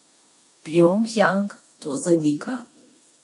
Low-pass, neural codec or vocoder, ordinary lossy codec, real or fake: 10.8 kHz; codec, 16 kHz in and 24 kHz out, 0.9 kbps, LongCat-Audio-Codec, four codebook decoder; none; fake